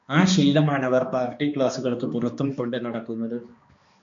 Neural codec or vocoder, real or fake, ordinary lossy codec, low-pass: codec, 16 kHz, 2 kbps, X-Codec, HuBERT features, trained on balanced general audio; fake; MP3, 48 kbps; 7.2 kHz